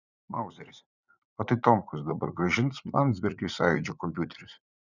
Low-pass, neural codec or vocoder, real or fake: 7.2 kHz; vocoder, 44.1 kHz, 80 mel bands, Vocos; fake